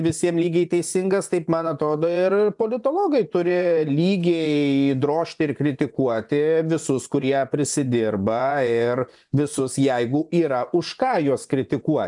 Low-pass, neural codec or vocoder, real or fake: 10.8 kHz; vocoder, 24 kHz, 100 mel bands, Vocos; fake